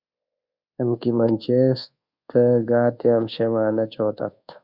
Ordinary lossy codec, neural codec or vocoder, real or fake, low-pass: Opus, 64 kbps; codec, 24 kHz, 1.2 kbps, DualCodec; fake; 5.4 kHz